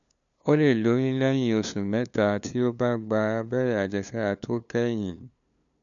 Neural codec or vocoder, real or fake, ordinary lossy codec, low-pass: codec, 16 kHz, 2 kbps, FunCodec, trained on LibriTTS, 25 frames a second; fake; none; 7.2 kHz